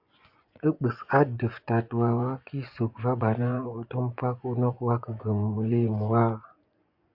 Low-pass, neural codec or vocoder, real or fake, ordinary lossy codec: 5.4 kHz; vocoder, 24 kHz, 100 mel bands, Vocos; fake; AAC, 48 kbps